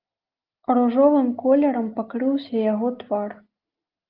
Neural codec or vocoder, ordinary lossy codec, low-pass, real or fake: none; Opus, 32 kbps; 5.4 kHz; real